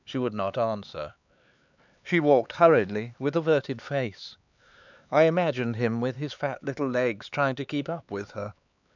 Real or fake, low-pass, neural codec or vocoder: fake; 7.2 kHz; codec, 16 kHz, 4 kbps, X-Codec, HuBERT features, trained on LibriSpeech